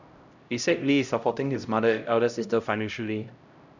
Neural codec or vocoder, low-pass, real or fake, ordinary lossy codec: codec, 16 kHz, 0.5 kbps, X-Codec, HuBERT features, trained on LibriSpeech; 7.2 kHz; fake; none